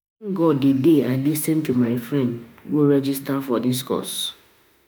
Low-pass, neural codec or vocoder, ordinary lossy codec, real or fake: none; autoencoder, 48 kHz, 32 numbers a frame, DAC-VAE, trained on Japanese speech; none; fake